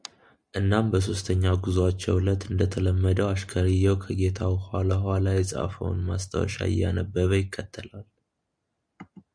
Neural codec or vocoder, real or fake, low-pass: none; real; 9.9 kHz